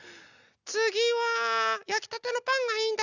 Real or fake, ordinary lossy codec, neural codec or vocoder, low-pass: real; none; none; 7.2 kHz